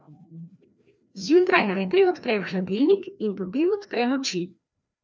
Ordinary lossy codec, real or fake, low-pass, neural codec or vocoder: none; fake; none; codec, 16 kHz, 1 kbps, FreqCodec, larger model